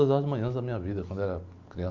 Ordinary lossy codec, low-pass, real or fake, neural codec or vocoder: MP3, 48 kbps; 7.2 kHz; real; none